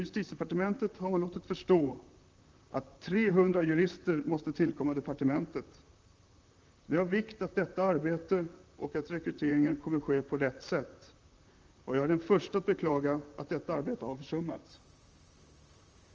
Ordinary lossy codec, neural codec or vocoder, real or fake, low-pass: Opus, 24 kbps; vocoder, 44.1 kHz, 128 mel bands, Pupu-Vocoder; fake; 7.2 kHz